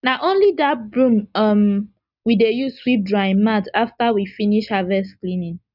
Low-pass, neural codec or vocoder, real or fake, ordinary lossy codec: 5.4 kHz; none; real; none